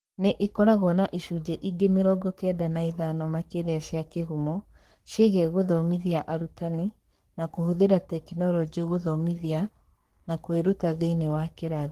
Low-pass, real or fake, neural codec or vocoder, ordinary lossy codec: 14.4 kHz; fake; codec, 44.1 kHz, 3.4 kbps, Pupu-Codec; Opus, 24 kbps